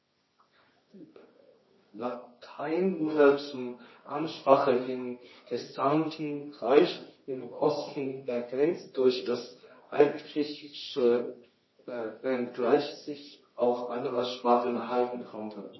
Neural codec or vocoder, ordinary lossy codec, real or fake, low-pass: codec, 24 kHz, 0.9 kbps, WavTokenizer, medium music audio release; MP3, 24 kbps; fake; 7.2 kHz